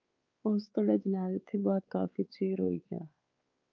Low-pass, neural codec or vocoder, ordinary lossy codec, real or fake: 7.2 kHz; codec, 16 kHz, 4 kbps, X-Codec, WavLM features, trained on Multilingual LibriSpeech; Opus, 24 kbps; fake